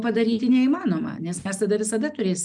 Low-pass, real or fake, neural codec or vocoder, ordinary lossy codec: 10.8 kHz; real; none; Opus, 32 kbps